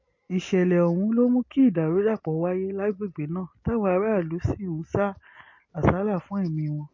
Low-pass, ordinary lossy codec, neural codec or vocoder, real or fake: 7.2 kHz; MP3, 32 kbps; none; real